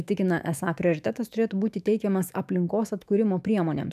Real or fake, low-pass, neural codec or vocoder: fake; 14.4 kHz; autoencoder, 48 kHz, 128 numbers a frame, DAC-VAE, trained on Japanese speech